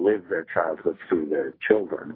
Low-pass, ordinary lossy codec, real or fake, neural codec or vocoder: 5.4 kHz; AAC, 24 kbps; fake; codec, 44.1 kHz, 2.6 kbps, SNAC